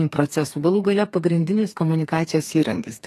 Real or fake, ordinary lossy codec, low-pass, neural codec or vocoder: fake; AAC, 48 kbps; 14.4 kHz; codec, 32 kHz, 1.9 kbps, SNAC